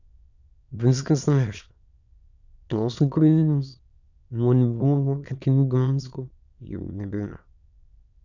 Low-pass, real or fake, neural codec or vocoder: 7.2 kHz; fake; autoencoder, 22.05 kHz, a latent of 192 numbers a frame, VITS, trained on many speakers